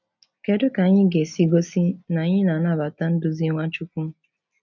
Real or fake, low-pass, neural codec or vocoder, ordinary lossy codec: real; 7.2 kHz; none; none